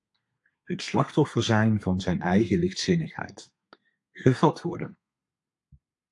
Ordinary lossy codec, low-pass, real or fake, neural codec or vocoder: AAC, 64 kbps; 10.8 kHz; fake; codec, 32 kHz, 1.9 kbps, SNAC